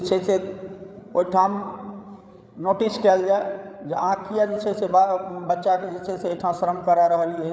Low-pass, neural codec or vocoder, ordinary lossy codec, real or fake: none; codec, 16 kHz, 8 kbps, FreqCodec, larger model; none; fake